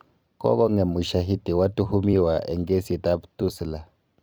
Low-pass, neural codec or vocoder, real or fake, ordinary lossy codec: none; vocoder, 44.1 kHz, 128 mel bands every 256 samples, BigVGAN v2; fake; none